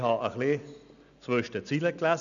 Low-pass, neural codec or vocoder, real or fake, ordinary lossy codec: 7.2 kHz; none; real; none